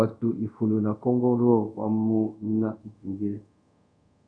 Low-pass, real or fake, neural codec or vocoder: 9.9 kHz; fake; codec, 24 kHz, 0.5 kbps, DualCodec